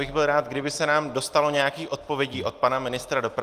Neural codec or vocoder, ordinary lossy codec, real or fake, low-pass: none; Opus, 24 kbps; real; 14.4 kHz